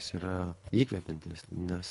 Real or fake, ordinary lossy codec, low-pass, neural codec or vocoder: fake; MP3, 64 kbps; 10.8 kHz; codec, 24 kHz, 3 kbps, HILCodec